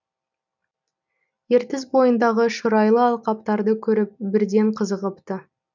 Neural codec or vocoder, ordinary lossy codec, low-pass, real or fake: none; none; 7.2 kHz; real